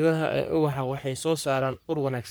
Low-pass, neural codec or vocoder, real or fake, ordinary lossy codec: none; codec, 44.1 kHz, 3.4 kbps, Pupu-Codec; fake; none